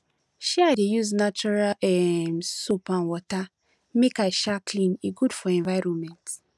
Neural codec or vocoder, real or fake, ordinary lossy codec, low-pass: none; real; none; none